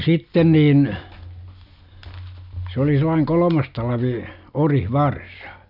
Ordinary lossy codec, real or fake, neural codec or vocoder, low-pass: none; real; none; 5.4 kHz